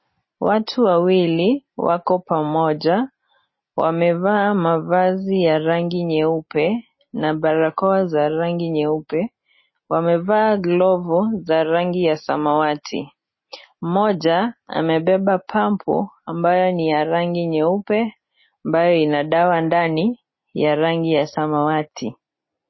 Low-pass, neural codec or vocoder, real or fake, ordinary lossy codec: 7.2 kHz; none; real; MP3, 24 kbps